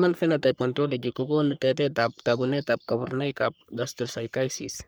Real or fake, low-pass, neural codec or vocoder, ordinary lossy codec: fake; none; codec, 44.1 kHz, 3.4 kbps, Pupu-Codec; none